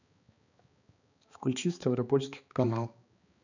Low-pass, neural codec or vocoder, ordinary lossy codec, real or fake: 7.2 kHz; codec, 16 kHz, 2 kbps, X-Codec, HuBERT features, trained on balanced general audio; none; fake